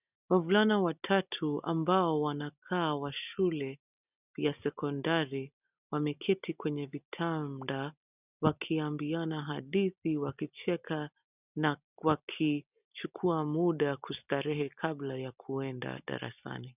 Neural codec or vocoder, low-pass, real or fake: none; 3.6 kHz; real